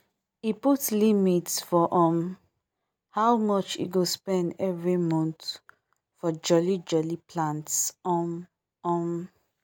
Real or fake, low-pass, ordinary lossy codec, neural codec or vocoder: real; none; none; none